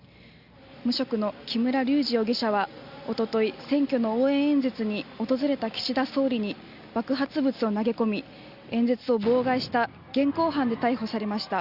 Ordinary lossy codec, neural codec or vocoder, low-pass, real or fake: Opus, 64 kbps; none; 5.4 kHz; real